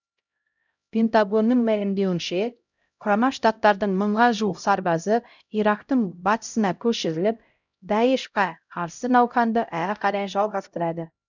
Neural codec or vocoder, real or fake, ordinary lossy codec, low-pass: codec, 16 kHz, 0.5 kbps, X-Codec, HuBERT features, trained on LibriSpeech; fake; none; 7.2 kHz